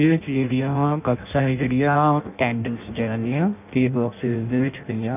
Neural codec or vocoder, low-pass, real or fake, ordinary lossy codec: codec, 16 kHz in and 24 kHz out, 0.6 kbps, FireRedTTS-2 codec; 3.6 kHz; fake; none